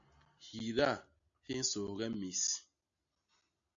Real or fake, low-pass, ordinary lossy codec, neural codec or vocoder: real; 7.2 kHz; AAC, 64 kbps; none